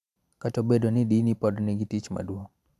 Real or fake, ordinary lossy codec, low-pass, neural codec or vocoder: fake; none; 14.4 kHz; vocoder, 44.1 kHz, 128 mel bands every 512 samples, BigVGAN v2